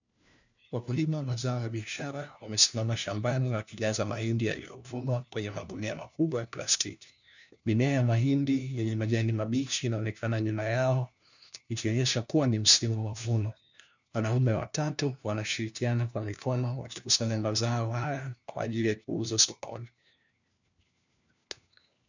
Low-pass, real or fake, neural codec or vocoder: 7.2 kHz; fake; codec, 16 kHz, 1 kbps, FunCodec, trained on LibriTTS, 50 frames a second